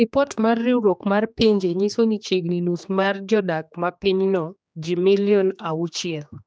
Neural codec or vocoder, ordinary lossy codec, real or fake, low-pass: codec, 16 kHz, 2 kbps, X-Codec, HuBERT features, trained on general audio; none; fake; none